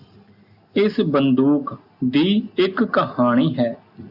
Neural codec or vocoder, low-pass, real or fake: none; 5.4 kHz; real